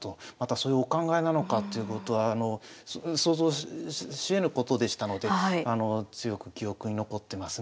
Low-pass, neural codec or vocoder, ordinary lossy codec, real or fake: none; none; none; real